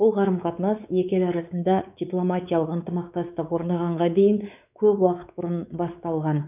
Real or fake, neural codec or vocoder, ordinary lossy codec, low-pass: fake; codec, 16 kHz, 4 kbps, X-Codec, WavLM features, trained on Multilingual LibriSpeech; none; 3.6 kHz